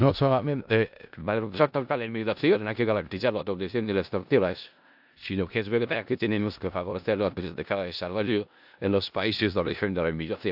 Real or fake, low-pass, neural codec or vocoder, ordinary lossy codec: fake; 5.4 kHz; codec, 16 kHz in and 24 kHz out, 0.4 kbps, LongCat-Audio-Codec, four codebook decoder; AAC, 48 kbps